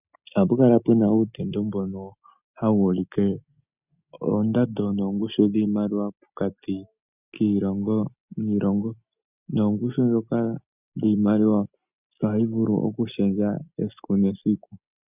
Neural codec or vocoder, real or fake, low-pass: none; real; 3.6 kHz